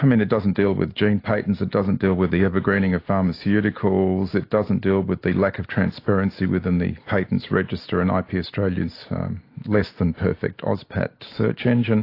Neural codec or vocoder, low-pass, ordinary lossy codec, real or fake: none; 5.4 kHz; AAC, 32 kbps; real